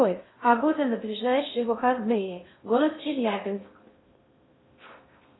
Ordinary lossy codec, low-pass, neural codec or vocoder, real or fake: AAC, 16 kbps; 7.2 kHz; codec, 16 kHz in and 24 kHz out, 0.6 kbps, FocalCodec, streaming, 2048 codes; fake